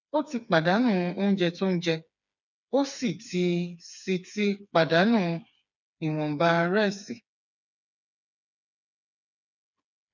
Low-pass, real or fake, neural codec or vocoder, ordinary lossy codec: 7.2 kHz; fake; codec, 16 kHz, 4 kbps, FreqCodec, smaller model; none